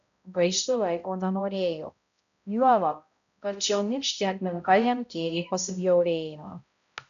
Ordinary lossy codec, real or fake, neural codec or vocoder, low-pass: MP3, 96 kbps; fake; codec, 16 kHz, 0.5 kbps, X-Codec, HuBERT features, trained on balanced general audio; 7.2 kHz